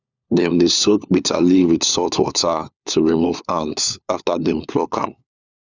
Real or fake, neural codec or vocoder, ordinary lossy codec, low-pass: fake; codec, 16 kHz, 4 kbps, FunCodec, trained on LibriTTS, 50 frames a second; none; 7.2 kHz